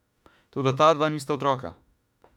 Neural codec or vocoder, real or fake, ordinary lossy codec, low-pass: autoencoder, 48 kHz, 32 numbers a frame, DAC-VAE, trained on Japanese speech; fake; none; 19.8 kHz